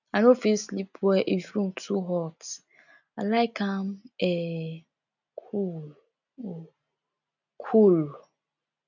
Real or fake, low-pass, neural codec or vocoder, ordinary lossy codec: real; 7.2 kHz; none; none